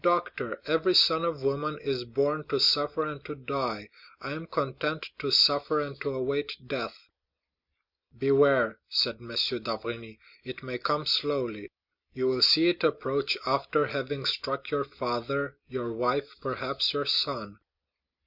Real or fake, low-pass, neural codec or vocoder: real; 5.4 kHz; none